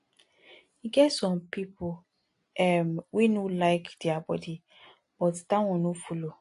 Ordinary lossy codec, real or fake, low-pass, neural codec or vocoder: AAC, 48 kbps; real; 10.8 kHz; none